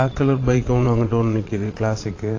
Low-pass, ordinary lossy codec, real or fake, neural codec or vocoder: 7.2 kHz; AAC, 32 kbps; fake; vocoder, 22.05 kHz, 80 mel bands, Vocos